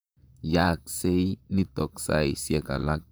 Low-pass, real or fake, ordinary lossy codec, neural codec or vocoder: none; fake; none; vocoder, 44.1 kHz, 128 mel bands, Pupu-Vocoder